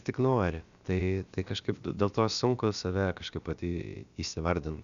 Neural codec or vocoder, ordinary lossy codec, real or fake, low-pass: codec, 16 kHz, about 1 kbps, DyCAST, with the encoder's durations; MP3, 96 kbps; fake; 7.2 kHz